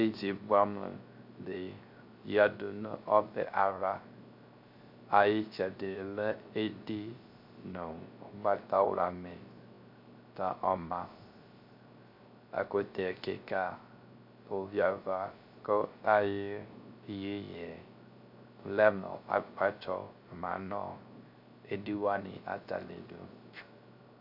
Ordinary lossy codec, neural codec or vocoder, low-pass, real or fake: MP3, 48 kbps; codec, 16 kHz, 0.3 kbps, FocalCodec; 5.4 kHz; fake